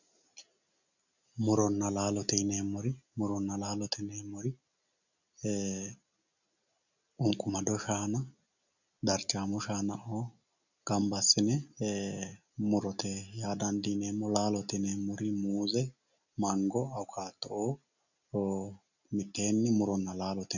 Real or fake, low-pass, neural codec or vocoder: real; 7.2 kHz; none